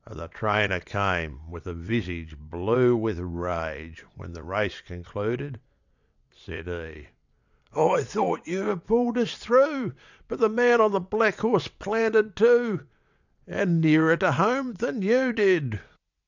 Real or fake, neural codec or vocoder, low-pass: fake; vocoder, 22.05 kHz, 80 mel bands, WaveNeXt; 7.2 kHz